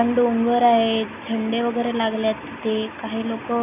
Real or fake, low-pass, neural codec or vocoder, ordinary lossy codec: real; 3.6 kHz; none; none